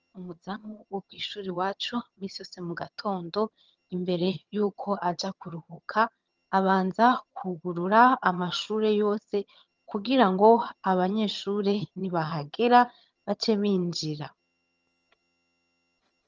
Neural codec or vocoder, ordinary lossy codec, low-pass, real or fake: vocoder, 22.05 kHz, 80 mel bands, HiFi-GAN; Opus, 24 kbps; 7.2 kHz; fake